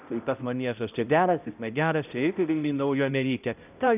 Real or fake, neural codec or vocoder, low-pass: fake; codec, 16 kHz, 0.5 kbps, X-Codec, HuBERT features, trained on balanced general audio; 3.6 kHz